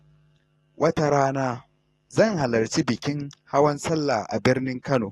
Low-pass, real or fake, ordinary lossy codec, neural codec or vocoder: 19.8 kHz; fake; AAC, 32 kbps; codec, 44.1 kHz, 7.8 kbps, DAC